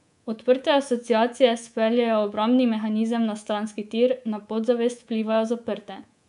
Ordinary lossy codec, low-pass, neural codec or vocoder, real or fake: none; 10.8 kHz; codec, 24 kHz, 3.1 kbps, DualCodec; fake